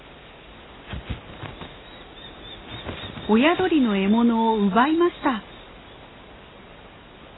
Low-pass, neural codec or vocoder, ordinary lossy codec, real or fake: 7.2 kHz; none; AAC, 16 kbps; real